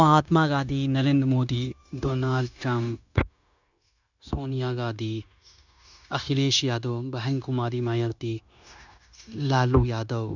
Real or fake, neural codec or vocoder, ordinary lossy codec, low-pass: fake; codec, 16 kHz, 0.9 kbps, LongCat-Audio-Codec; none; 7.2 kHz